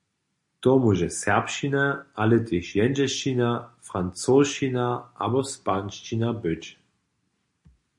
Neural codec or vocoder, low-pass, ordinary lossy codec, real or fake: none; 10.8 kHz; MP3, 48 kbps; real